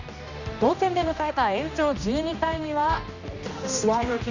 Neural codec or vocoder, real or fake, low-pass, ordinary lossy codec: codec, 16 kHz, 1 kbps, X-Codec, HuBERT features, trained on general audio; fake; 7.2 kHz; none